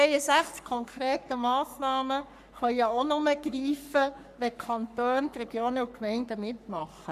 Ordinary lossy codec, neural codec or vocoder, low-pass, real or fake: none; codec, 44.1 kHz, 3.4 kbps, Pupu-Codec; 14.4 kHz; fake